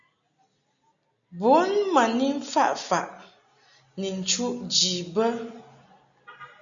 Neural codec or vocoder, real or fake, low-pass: none; real; 7.2 kHz